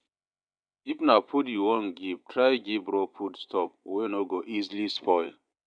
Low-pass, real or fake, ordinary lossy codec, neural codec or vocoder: 9.9 kHz; fake; none; vocoder, 24 kHz, 100 mel bands, Vocos